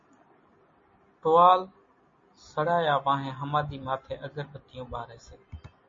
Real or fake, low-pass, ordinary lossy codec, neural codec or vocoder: real; 7.2 kHz; MP3, 32 kbps; none